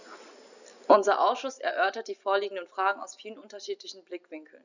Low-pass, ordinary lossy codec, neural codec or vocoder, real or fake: 7.2 kHz; none; none; real